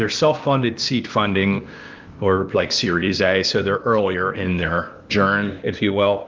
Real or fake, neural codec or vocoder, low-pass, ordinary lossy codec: fake; codec, 16 kHz, 0.8 kbps, ZipCodec; 7.2 kHz; Opus, 24 kbps